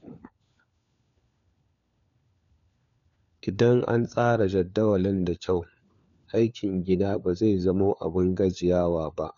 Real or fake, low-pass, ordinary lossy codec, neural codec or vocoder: fake; 7.2 kHz; none; codec, 16 kHz, 4 kbps, FunCodec, trained on LibriTTS, 50 frames a second